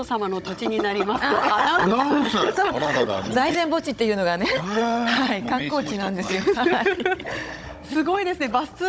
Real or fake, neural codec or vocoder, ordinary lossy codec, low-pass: fake; codec, 16 kHz, 16 kbps, FunCodec, trained on Chinese and English, 50 frames a second; none; none